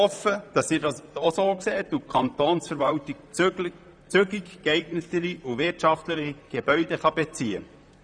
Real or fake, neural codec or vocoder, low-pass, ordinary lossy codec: fake; vocoder, 44.1 kHz, 128 mel bands, Pupu-Vocoder; 9.9 kHz; none